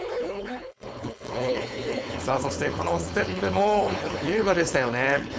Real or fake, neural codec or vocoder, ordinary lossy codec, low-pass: fake; codec, 16 kHz, 4.8 kbps, FACodec; none; none